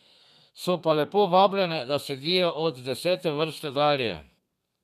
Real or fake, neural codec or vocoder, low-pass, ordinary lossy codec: fake; codec, 32 kHz, 1.9 kbps, SNAC; 14.4 kHz; none